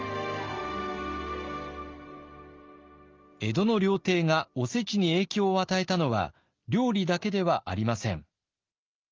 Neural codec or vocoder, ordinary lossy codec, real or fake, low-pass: none; Opus, 32 kbps; real; 7.2 kHz